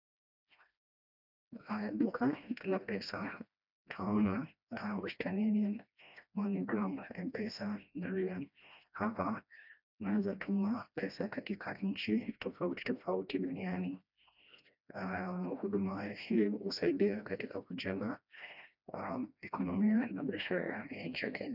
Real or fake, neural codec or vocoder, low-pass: fake; codec, 16 kHz, 1 kbps, FreqCodec, smaller model; 5.4 kHz